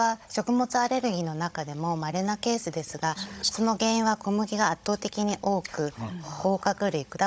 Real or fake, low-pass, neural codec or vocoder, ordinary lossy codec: fake; none; codec, 16 kHz, 16 kbps, FunCodec, trained on Chinese and English, 50 frames a second; none